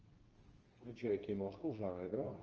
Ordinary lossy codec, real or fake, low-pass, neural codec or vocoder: Opus, 24 kbps; fake; 7.2 kHz; codec, 24 kHz, 0.9 kbps, WavTokenizer, medium speech release version 2